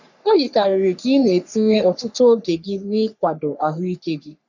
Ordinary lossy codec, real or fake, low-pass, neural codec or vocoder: none; fake; 7.2 kHz; codec, 44.1 kHz, 3.4 kbps, Pupu-Codec